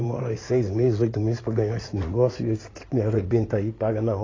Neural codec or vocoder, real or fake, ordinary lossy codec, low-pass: codec, 16 kHz in and 24 kHz out, 2.2 kbps, FireRedTTS-2 codec; fake; AAC, 32 kbps; 7.2 kHz